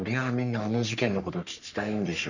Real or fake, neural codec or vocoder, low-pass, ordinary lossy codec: fake; codec, 44.1 kHz, 3.4 kbps, Pupu-Codec; 7.2 kHz; none